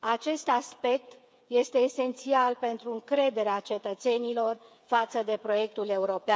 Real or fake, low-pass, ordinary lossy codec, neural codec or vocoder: fake; none; none; codec, 16 kHz, 8 kbps, FreqCodec, smaller model